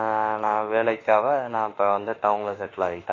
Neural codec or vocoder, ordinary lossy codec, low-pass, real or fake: autoencoder, 48 kHz, 32 numbers a frame, DAC-VAE, trained on Japanese speech; AAC, 32 kbps; 7.2 kHz; fake